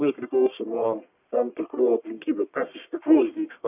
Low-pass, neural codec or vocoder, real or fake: 3.6 kHz; codec, 44.1 kHz, 1.7 kbps, Pupu-Codec; fake